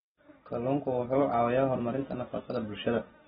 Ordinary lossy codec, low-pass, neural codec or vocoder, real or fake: AAC, 16 kbps; 7.2 kHz; none; real